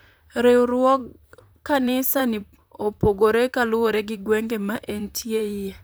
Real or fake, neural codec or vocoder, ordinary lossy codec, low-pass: fake; vocoder, 44.1 kHz, 128 mel bands, Pupu-Vocoder; none; none